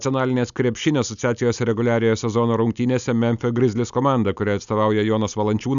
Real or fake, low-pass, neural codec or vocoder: real; 7.2 kHz; none